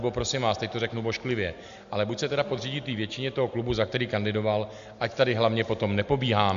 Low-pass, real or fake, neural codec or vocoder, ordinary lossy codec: 7.2 kHz; real; none; MP3, 64 kbps